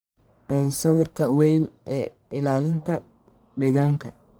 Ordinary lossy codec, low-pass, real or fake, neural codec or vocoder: none; none; fake; codec, 44.1 kHz, 1.7 kbps, Pupu-Codec